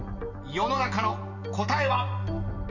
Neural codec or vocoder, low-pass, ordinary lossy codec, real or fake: none; 7.2 kHz; none; real